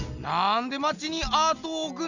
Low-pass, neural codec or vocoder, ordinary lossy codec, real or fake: 7.2 kHz; none; none; real